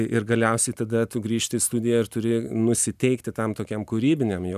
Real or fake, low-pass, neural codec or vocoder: real; 14.4 kHz; none